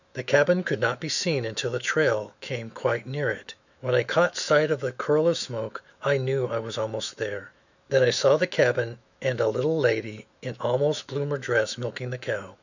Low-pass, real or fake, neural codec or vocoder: 7.2 kHz; fake; autoencoder, 48 kHz, 128 numbers a frame, DAC-VAE, trained on Japanese speech